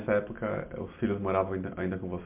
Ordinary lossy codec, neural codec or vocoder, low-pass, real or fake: MP3, 32 kbps; none; 3.6 kHz; real